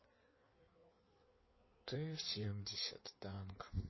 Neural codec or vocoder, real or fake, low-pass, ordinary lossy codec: codec, 16 kHz in and 24 kHz out, 1.1 kbps, FireRedTTS-2 codec; fake; 7.2 kHz; MP3, 24 kbps